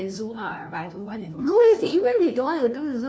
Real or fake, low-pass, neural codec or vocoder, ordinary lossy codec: fake; none; codec, 16 kHz, 1 kbps, FunCodec, trained on LibriTTS, 50 frames a second; none